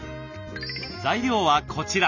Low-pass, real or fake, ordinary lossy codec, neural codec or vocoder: 7.2 kHz; real; none; none